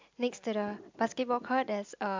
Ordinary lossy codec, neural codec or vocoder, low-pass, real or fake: MP3, 64 kbps; none; 7.2 kHz; real